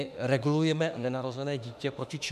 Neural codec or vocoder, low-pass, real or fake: autoencoder, 48 kHz, 32 numbers a frame, DAC-VAE, trained on Japanese speech; 14.4 kHz; fake